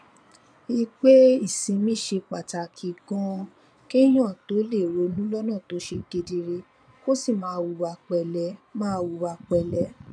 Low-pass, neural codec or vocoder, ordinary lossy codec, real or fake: 9.9 kHz; vocoder, 22.05 kHz, 80 mel bands, Vocos; none; fake